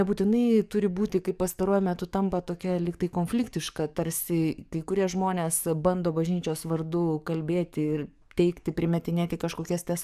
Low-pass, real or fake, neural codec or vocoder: 14.4 kHz; fake; codec, 44.1 kHz, 7.8 kbps, DAC